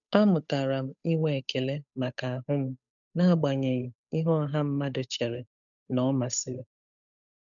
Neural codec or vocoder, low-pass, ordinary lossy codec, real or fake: codec, 16 kHz, 8 kbps, FunCodec, trained on Chinese and English, 25 frames a second; 7.2 kHz; none; fake